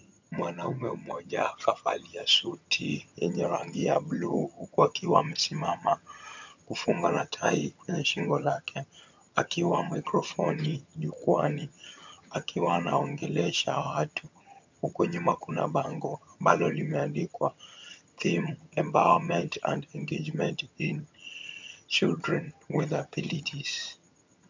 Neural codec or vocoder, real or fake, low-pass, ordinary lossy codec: vocoder, 22.05 kHz, 80 mel bands, HiFi-GAN; fake; 7.2 kHz; MP3, 64 kbps